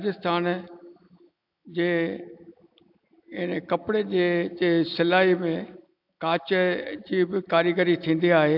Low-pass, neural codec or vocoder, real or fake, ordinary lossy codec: 5.4 kHz; none; real; AAC, 48 kbps